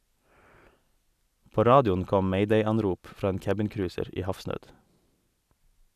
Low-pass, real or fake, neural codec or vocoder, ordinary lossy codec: 14.4 kHz; real; none; none